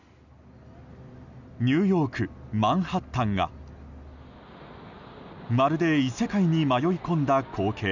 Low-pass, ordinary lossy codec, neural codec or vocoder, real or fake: 7.2 kHz; none; none; real